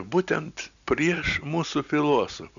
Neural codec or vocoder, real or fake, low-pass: none; real; 7.2 kHz